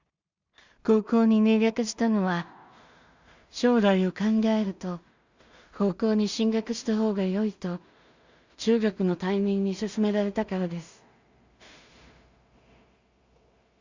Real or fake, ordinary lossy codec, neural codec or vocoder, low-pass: fake; Opus, 64 kbps; codec, 16 kHz in and 24 kHz out, 0.4 kbps, LongCat-Audio-Codec, two codebook decoder; 7.2 kHz